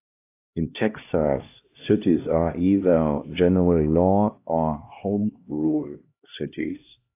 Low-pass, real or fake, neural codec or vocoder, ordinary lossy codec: 3.6 kHz; fake; codec, 16 kHz, 1 kbps, X-Codec, HuBERT features, trained on LibriSpeech; AAC, 24 kbps